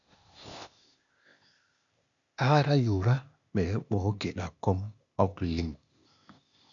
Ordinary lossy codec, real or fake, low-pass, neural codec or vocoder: MP3, 96 kbps; fake; 7.2 kHz; codec, 16 kHz, 0.8 kbps, ZipCodec